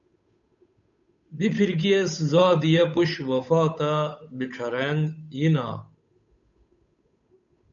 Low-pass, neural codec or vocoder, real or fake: 7.2 kHz; codec, 16 kHz, 8 kbps, FunCodec, trained on Chinese and English, 25 frames a second; fake